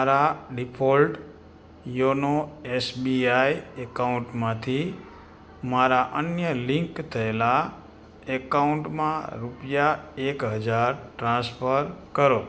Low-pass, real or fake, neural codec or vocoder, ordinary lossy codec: none; real; none; none